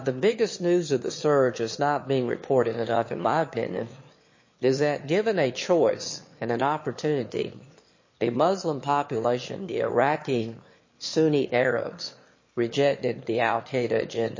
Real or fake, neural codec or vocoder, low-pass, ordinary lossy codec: fake; autoencoder, 22.05 kHz, a latent of 192 numbers a frame, VITS, trained on one speaker; 7.2 kHz; MP3, 32 kbps